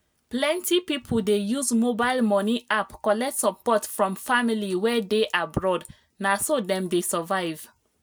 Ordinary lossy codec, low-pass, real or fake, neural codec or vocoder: none; none; real; none